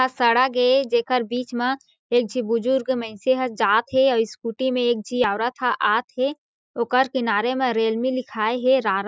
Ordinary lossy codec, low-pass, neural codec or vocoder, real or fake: none; none; none; real